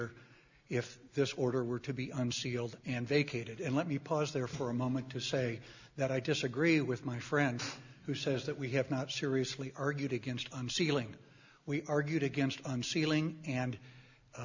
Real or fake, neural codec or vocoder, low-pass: real; none; 7.2 kHz